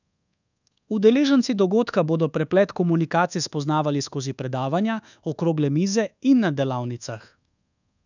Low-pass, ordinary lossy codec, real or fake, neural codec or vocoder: 7.2 kHz; none; fake; codec, 24 kHz, 1.2 kbps, DualCodec